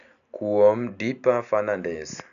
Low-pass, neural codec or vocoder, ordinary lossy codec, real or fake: 7.2 kHz; none; none; real